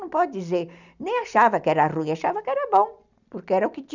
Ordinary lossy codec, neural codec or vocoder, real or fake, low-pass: none; none; real; 7.2 kHz